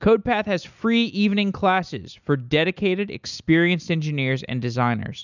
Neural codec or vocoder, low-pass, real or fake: none; 7.2 kHz; real